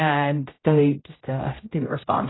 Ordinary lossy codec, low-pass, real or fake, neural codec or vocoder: AAC, 16 kbps; 7.2 kHz; fake; codec, 16 kHz, 0.5 kbps, X-Codec, HuBERT features, trained on general audio